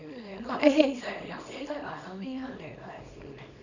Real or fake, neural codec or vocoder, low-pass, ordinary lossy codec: fake; codec, 24 kHz, 0.9 kbps, WavTokenizer, small release; 7.2 kHz; none